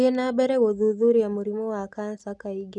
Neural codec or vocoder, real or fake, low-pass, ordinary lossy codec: none; real; 10.8 kHz; none